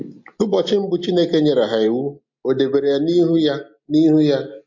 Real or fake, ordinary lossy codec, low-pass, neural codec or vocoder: real; MP3, 48 kbps; 7.2 kHz; none